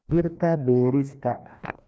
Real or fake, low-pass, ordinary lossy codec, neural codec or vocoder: fake; none; none; codec, 16 kHz, 1 kbps, FreqCodec, larger model